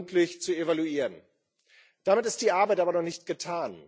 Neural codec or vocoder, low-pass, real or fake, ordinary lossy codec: none; none; real; none